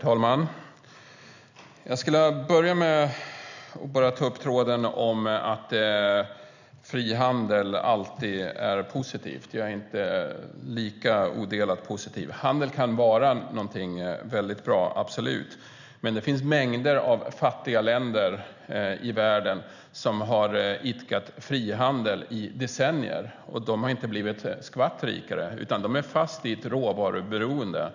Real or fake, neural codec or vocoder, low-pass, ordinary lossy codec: real; none; 7.2 kHz; none